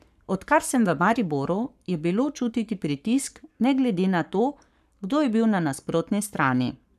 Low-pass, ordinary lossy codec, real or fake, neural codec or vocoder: 14.4 kHz; none; fake; codec, 44.1 kHz, 7.8 kbps, Pupu-Codec